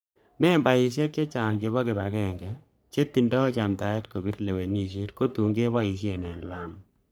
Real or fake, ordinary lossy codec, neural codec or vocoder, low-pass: fake; none; codec, 44.1 kHz, 3.4 kbps, Pupu-Codec; none